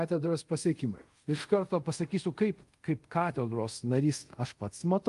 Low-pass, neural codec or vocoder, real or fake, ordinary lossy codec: 10.8 kHz; codec, 24 kHz, 0.5 kbps, DualCodec; fake; Opus, 32 kbps